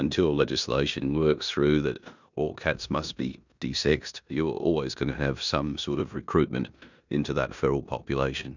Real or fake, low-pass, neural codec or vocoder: fake; 7.2 kHz; codec, 16 kHz in and 24 kHz out, 0.9 kbps, LongCat-Audio-Codec, four codebook decoder